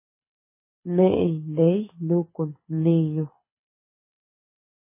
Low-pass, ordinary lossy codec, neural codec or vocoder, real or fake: 3.6 kHz; MP3, 16 kbps; codec, 24 kHz, 6 kbps, HILCodec; fake